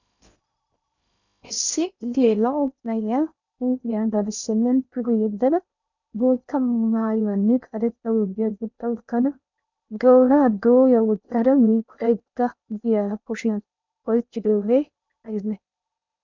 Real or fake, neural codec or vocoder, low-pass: fake; codec, 16 kHz in and 24 kHz out, 0.6 kbps, FocalCodec, streaming, 2048 codes; 7.2 kHz